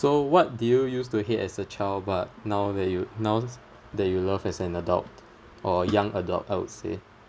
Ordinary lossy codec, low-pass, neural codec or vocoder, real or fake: none; none; none; real